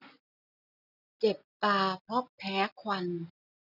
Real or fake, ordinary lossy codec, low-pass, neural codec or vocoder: real; none; 5.4 kHz; none